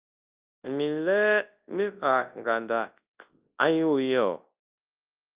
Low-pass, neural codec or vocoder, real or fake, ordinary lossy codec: 3.6 kHz; codec, 24 kHz, 0.9 kbps, WavTokenizer, large speech release; fake; Opus, 24 kbps